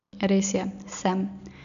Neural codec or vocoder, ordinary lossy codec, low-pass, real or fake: none; none; 7.2 kHz; real